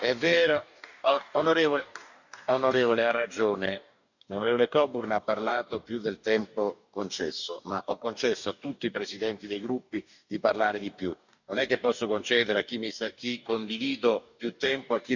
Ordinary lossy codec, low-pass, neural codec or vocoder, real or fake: none; 7.2 kHz; codec, 44.1 kHz, 2.6 kbps, DAC; fake